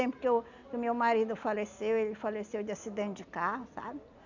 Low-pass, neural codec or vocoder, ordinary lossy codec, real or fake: 7.2 kHz; none; none; real